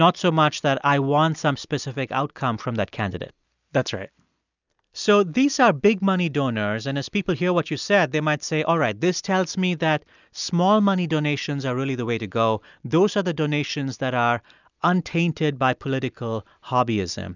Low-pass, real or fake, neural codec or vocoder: 7.2 kHz; real; none